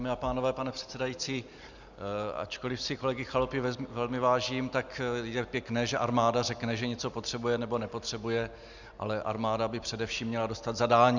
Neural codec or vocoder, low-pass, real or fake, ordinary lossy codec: none; 7.2 kHz; real; Opus, 64 kbps